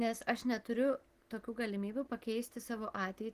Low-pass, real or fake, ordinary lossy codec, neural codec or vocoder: 14.4 kHz; real; Opus, 16 kbps; none